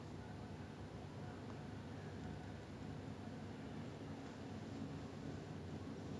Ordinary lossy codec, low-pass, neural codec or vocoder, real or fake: none; none; none; real